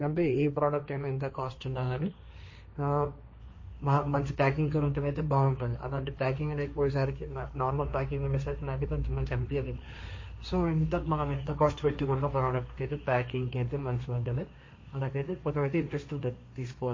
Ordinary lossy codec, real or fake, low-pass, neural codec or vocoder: MP3, 32 kbps; fake; 7.2 kHz; codec, 16 kHz, 1.1 kbps, Voila-Tokenizer